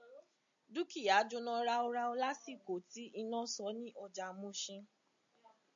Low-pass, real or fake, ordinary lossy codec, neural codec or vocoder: 7.2 kHz; real; AAC, 64 kbps; none